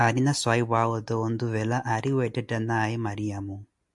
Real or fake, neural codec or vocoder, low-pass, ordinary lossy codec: real; none; 10.8 kHz; MP3, 96 kbps